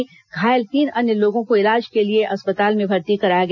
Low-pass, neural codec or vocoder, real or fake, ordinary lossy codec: 7.2 kHz; none; real; none